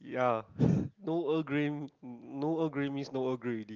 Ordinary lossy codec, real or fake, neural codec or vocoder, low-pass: Opus, 32 kbps; real; none; 7.2 kHz